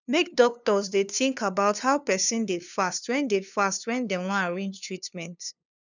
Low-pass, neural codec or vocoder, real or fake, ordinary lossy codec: 7.2 kHz; codec, 16 kHz, 2 kbps, FunCodec, trained on LibriTTS, 25 frames a second; fake; none